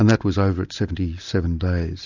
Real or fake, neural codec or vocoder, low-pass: real; none; 7.2 kHz